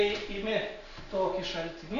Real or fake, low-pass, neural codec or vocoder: real; 7.2 kHz; none